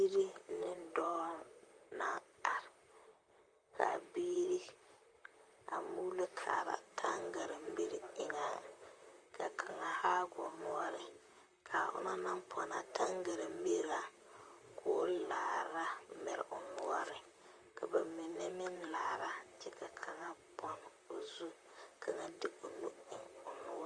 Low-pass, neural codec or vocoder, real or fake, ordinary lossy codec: 9.9 kHz; vocoder, 44.1 kHz, 128 mel bands, Pupu-Vocoder; fake; Opus, 32 kbps